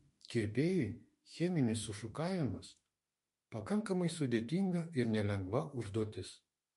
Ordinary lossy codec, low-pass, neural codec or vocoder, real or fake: MP3, 48 kbps; 14.4 kHz; autoencoder, 48 kHz, 32 numbers a frame, DAC-VAE, trained on Japanese speech; fake